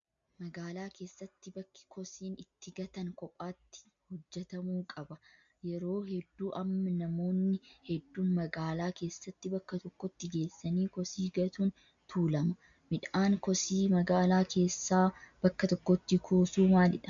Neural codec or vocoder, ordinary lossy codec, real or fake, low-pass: none; MP3, 64 kbps; real; 7.2 kHz